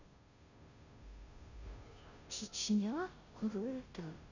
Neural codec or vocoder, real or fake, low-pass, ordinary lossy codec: codec, 16 kHz, 0.5 kbps, FunCodec, trained on Chinese and English, 25 frames a second; fake; 7.2 kHz; none